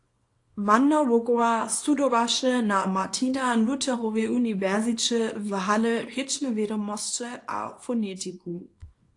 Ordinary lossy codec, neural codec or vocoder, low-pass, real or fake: AAC, 48 kbps; codec, 24 kHz, 0.9 kbps, WavTokenizer, small release; 10.8 kHz; fake